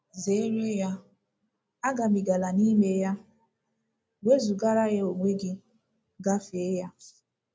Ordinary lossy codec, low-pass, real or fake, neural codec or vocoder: none; none; real; none